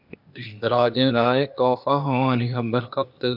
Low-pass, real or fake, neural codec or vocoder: 5.4 kHz; fake; codec, 16 kHz, 0.8 kbps, ZipCodec